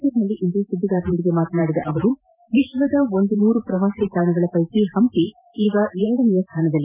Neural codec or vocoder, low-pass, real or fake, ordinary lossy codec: vocoder, 44.1 kHz, 128 mel bands every 512 samples, BigVGAN v2; 3.6 kHz; fake; none